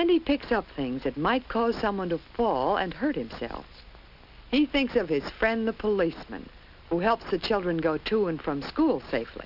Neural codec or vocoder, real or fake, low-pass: none; real; 5.4 kHz